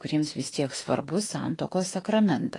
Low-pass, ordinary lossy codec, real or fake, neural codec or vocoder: 10.8 kHz; AAC, 32 kbps; fake; autoencoder, 48 kHz, 32 numbers a frame, DAC-VAE, trained on Japanese speech